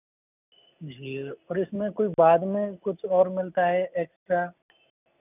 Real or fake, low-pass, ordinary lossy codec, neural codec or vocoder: real; 3.6 kHz; none; none